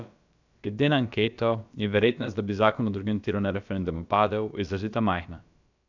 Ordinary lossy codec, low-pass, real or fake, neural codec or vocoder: none; 7.2 kHz; fake; codec, 16 kHz, about 1 kbps, DyCAST, with the encoder's durations